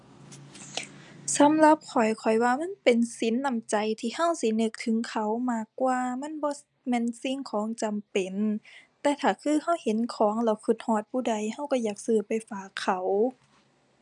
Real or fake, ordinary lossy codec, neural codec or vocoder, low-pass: real; none; none; 10.8 kHz